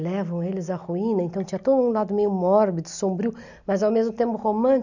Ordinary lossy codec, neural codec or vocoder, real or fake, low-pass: none; none; real; 7.2 kHz